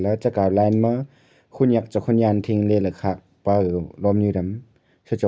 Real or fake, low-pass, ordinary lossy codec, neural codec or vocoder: real; none; none; none